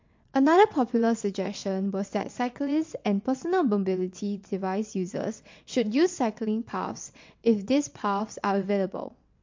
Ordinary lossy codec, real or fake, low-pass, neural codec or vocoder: MP3, 48 kbps; fake; 7.2 kHz; vocoder, 22.05 kHz, 80 mel bands, WaveNeXt